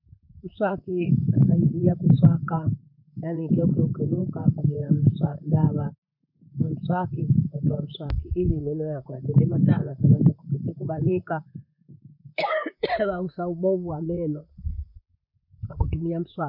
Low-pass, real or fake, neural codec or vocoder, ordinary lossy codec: 5.4 kHz; fake; vocoder, 44.1 kHz, 128 mel bands, Pupu-Vocoder; none